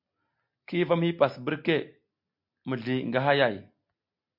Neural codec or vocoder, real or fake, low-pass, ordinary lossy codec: none; real; 5.4 kHz; MP3, 48 kbps